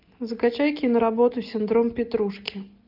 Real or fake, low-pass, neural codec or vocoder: real; 5.4 kHz; none